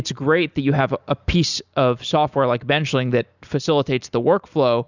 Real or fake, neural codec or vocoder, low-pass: real; none; 7.2 kHz